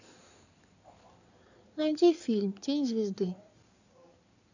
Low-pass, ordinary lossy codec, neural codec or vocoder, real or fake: 7.2 kHz; none; codec, 16 kHz in and 24 kHz out, 2.2 kbps, FireRedTTS-2 codec; fake